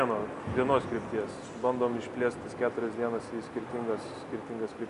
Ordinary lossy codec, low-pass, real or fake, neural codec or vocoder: AAC, 64 kbps; 10.8 kHz; real; none